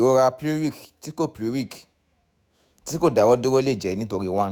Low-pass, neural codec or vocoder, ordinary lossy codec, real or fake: none; autoencoder, 48 kHz, 128 numbers a frame, DAC-VAE, trained on Japanese speech; none; fake